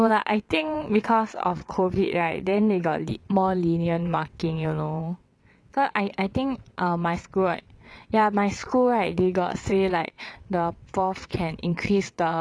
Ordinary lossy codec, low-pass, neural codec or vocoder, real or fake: none; none; vocoder, 22.05 kHz, 80 mel bands, WaveNeXt; fake